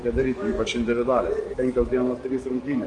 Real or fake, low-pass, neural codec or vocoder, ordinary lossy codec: fake; 10.8 kHz; codec, 44.1 kHz, 7.8 kbps, DAC; Opus, 32 kbps